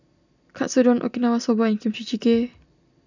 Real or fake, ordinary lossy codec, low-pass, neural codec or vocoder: real; none; 7.2 kHz; none